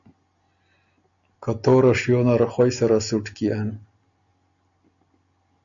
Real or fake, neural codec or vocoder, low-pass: real; none; 7.2 kHz